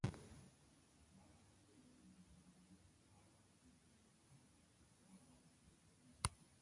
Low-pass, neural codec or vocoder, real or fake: 10.8 kHz; none; real